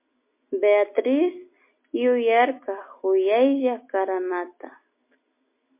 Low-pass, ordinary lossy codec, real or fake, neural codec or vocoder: 3.6 kHz; MP3, 24 kbps; real; none